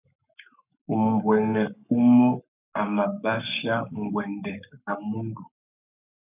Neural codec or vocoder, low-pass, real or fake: codec, 44.1 kHz, 7.8 kbps, Pupu-Codec; 3.6 kHz; fake